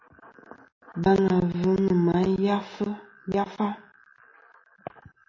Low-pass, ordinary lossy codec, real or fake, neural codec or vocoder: 7.2 kHz; MP3, 32 kbps; real; none